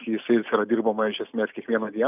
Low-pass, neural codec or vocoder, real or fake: 3.6 kHz; none; real